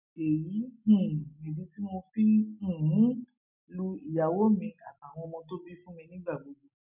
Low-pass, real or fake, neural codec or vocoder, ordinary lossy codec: 3.6 kHz; real; none; none